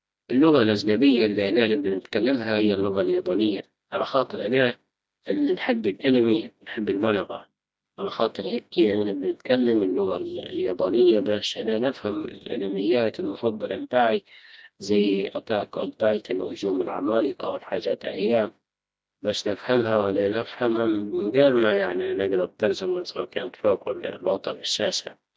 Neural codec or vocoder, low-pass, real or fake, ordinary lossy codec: codec, 16 kHz, 1 kbps, FreqCodec, smaller model; none; fake; none